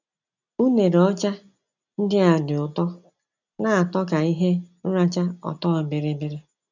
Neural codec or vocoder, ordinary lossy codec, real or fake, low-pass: none; none; real; 7.2 kHz